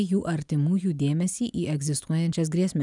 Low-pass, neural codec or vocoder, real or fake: 10.8 kHz; none; real